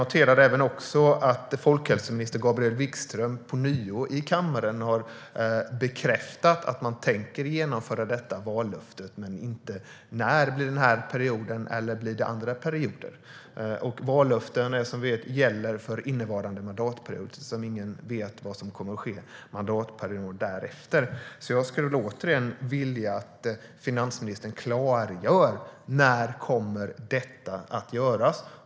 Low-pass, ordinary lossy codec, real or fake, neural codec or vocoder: none; none; real; none